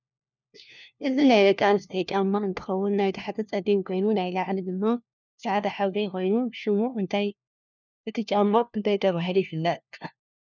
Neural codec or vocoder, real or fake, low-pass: codec, 16 kHz, 1 kbps, FunCodec, trained on LibriTTS, 50 frames a second; fake; 7.2 kHz